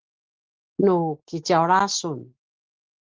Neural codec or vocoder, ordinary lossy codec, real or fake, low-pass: none; Opus, 16 kbps; real; 7.2 kHz